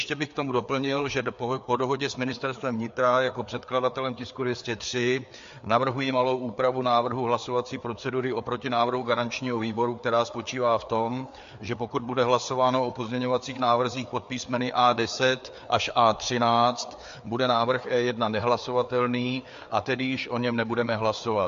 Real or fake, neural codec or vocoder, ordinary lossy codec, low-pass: fake; codec, 16 kHz, 4 kbps, FreqCodec, larger model; MP3, 48 kbps; 7.2 kHz